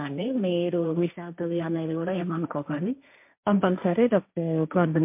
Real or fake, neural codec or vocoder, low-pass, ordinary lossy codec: fake; codec, 16 kHz, 1.1 kbps, Voila-Tokenizer; 3.6 kHz; MP3, 32 kbps